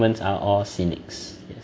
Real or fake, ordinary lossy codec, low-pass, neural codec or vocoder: real; none; none; none